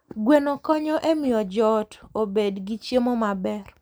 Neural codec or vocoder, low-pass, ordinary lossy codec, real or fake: none; none; none; real